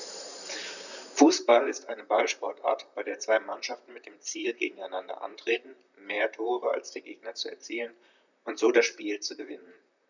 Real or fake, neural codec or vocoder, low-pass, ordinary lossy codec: fake; vocoder, 44.1 kHz, 128 mel bands, Pupu-Vocoder; 7.2 kHz; none